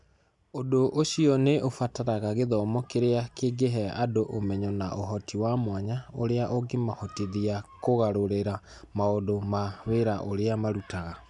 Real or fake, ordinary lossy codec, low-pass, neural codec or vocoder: real; none; 10.8 kHz; none